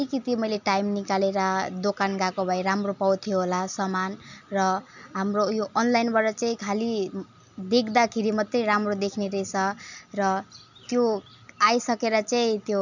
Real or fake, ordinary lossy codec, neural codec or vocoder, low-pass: real; none; none; 7.2 kHz